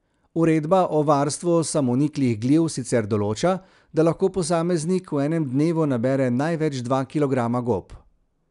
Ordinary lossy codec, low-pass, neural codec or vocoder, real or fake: none; 10.8 kHz; none; real